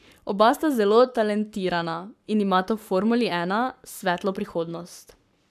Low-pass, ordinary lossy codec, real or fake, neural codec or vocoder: 14.4 kHz; none; fake; codec, 44.1 kHz, 7.8 kbps, Pupu-Codec